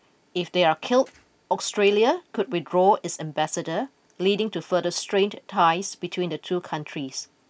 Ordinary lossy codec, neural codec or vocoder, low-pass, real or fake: none; none; none; real